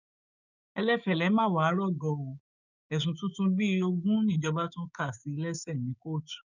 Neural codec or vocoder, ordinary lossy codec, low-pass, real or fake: codec, 16 kHz, 6 kbps, DAC; none; 7.2 kHz; fake